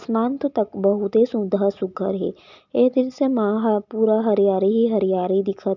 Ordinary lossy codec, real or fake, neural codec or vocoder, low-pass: none; real; none; 7.2 kHz